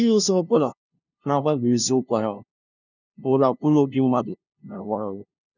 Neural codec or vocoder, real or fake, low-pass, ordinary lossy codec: codec, 16 kHz, 0.5 kbps, FunCodec, trained on LibriTTS, 25 frames a second; fake; 7.2 kHz; none